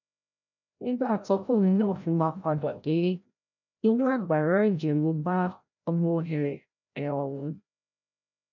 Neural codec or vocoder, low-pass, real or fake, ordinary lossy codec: codec, 16 kHz, 0.5 kbps, FreqCodec, larger model; 7.2 kHz; fake; none